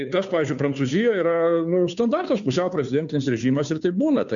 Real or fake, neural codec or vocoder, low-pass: fake; codec, 16 kHz, 2 kbps, FunCodec, trained on Chinese and English, 25 frames a second; 7.2 kHz